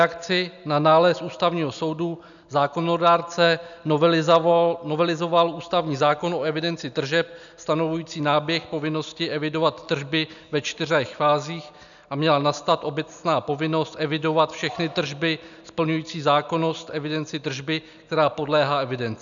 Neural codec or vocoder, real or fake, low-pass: none; real; 7.2 kHz